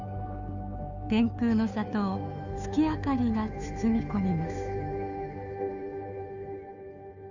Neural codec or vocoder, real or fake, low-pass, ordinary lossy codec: codec, 16 kHz, 2 kbps, FunCodec, trained on Chinese and English, 25 frames a second; fake; 7.2 kHz; none